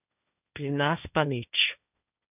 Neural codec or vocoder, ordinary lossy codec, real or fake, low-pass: codec, 16 kHz, 1.1 kbps, Voila-Tokenizer; none; fake; 3.6 kHz